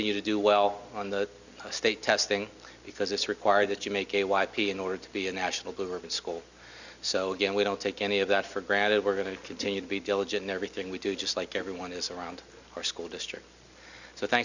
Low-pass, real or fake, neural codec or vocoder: 7.2 kHz; real; none